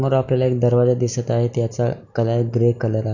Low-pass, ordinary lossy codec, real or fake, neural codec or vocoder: 7.2 kHz; none; real; none